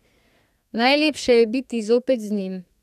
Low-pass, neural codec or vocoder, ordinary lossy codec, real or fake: 14.4 kHz; codec, 32 kHz, 1.9 kbps, SNAC; none; fake